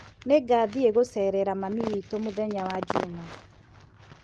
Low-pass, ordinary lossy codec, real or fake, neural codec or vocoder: 10.8 kHz; Opus, 16 kbps; real; none